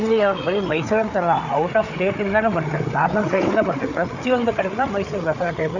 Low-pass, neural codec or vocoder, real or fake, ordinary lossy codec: 7.2 kHz; codec, 16 kHz, 8 kbps, FreqCodec, larger model; fake; none